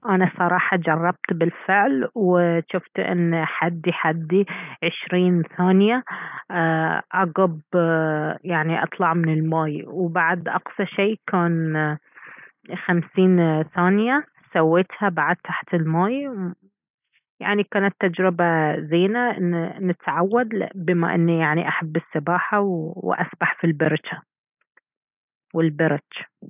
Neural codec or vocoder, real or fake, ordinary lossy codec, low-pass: none; real; none; 3.6 kHz